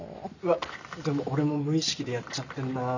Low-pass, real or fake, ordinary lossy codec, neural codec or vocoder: 7.2 kHz; real; none; none